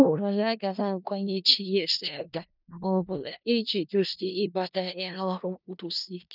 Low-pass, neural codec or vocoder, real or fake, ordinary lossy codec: 5.4 kHz; codec, 16 kHz in and 24 kHz out, 0.4 kbps, LongCat-Audio-Codec, four codebook decoder; fake; none